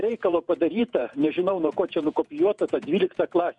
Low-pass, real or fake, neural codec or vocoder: 10.8 kHz; fake; vocoder, 48 kHz, 128 mel bands, Vocos